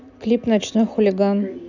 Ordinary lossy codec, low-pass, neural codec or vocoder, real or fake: none; 7.2 kHz; none; real